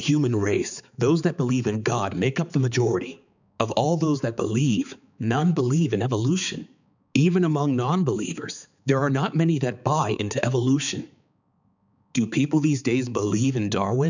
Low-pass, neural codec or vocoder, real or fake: 7.2 kHz; codec, 16 kHz, 4 kbps, X-Codec, HuBERT features, trained on balanced general audio; fake